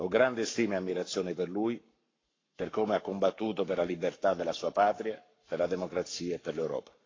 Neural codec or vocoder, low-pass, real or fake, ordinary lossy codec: codec, 44.1 kHz, 7.8 kbps, Pupu-Codec; 7.2 kHz; fake; AAC, 32 kbps